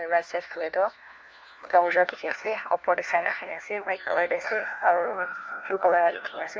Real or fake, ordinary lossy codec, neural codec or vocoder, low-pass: fake; none; codec, 16 kHz, 1 kbps, FunCodec, trained on LibriTTS, 50 frames a second; none